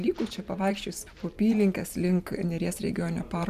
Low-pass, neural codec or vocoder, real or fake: 14.4 kHz; vocoder, 44.1 kHz, 128 mel bands every 512 samples, BigVGAN v2; fake